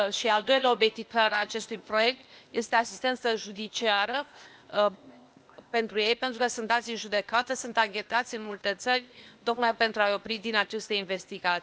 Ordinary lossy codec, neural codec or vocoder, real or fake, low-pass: none; codec, 16 kHz, 0.8 kbps, ZipCodec; fake; none